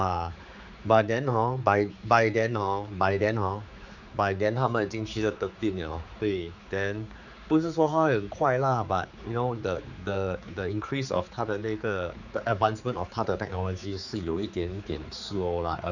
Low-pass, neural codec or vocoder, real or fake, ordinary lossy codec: 7.2 kHz; codec, 16 kHz, 4 kbps, X-Codec, HuBERT features, trained on general audio; fake; none